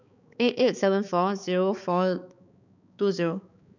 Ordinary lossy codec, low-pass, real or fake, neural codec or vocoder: none; 7.2 kHz; fake; codec, 16 kHz, 4 kbps, X-Codec, HuBERT features, trained on balanced general audio